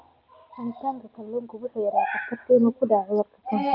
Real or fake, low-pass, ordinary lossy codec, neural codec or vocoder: real; 5.4 kHz; Opus, 24 kbps; none